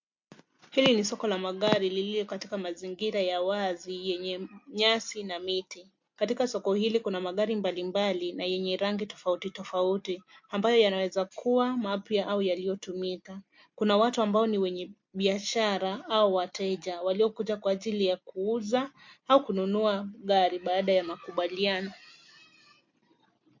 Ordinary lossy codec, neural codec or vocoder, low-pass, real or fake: MP3, 48 kbps; none; 7.2 kHz; real